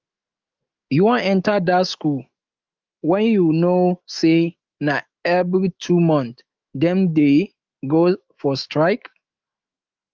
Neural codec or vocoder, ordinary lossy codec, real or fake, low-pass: autoencoder, 48 kHz, 128 numbers a frame, DAC-VAE, trained on Japanese speech; Opus, 24 kbps; fake; 7.2 kHz